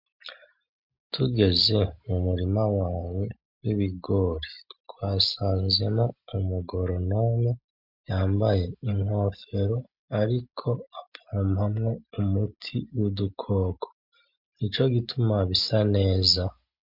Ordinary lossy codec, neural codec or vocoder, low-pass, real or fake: MP3, 48 kbps; none; 5.4 kHz; real